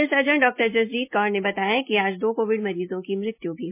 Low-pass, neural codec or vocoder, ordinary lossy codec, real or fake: 3.6 kHz; none; MP3, 24 kbps; real